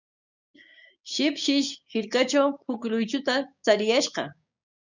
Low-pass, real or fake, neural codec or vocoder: 7.2 kHz; fake; codec, 16 kHz, 6 kbps, DAC